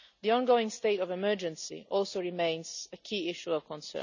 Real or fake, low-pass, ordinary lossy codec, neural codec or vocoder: real; 7.2 kHz; none; none